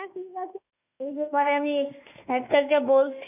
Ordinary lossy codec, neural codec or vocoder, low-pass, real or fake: none; autoencoder, 48 kHz, 32 numbers a frame, DAC-VAE, trained on Japanese speech; 3.6 kHz; fake